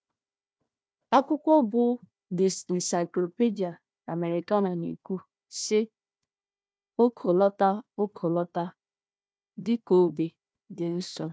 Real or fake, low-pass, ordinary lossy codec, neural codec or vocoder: fake; none; none; codec, 16 kHz, 1 kbps, FunCodec, trained on Chinese and English, 50 frames a second